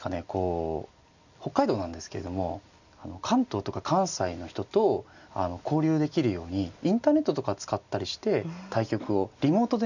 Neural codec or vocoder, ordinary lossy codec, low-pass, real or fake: none; none; 7.2 kHz; real